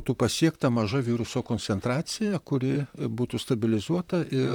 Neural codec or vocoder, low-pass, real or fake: vocoder, 44.1 kHz, 128 mel bands, Pupu-Vocoder; 19.8 kHz; fake